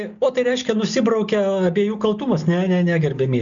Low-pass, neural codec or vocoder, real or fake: 7.2 kHz; none; real